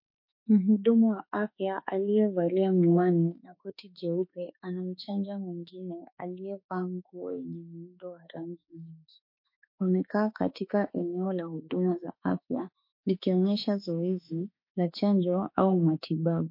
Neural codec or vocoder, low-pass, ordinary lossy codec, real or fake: autoencoder, 48 kHz, 32 numbers a frame, DAC-VAE, trained on Japanese speech; 5.4 kHz; MP3, 32 kbps; fake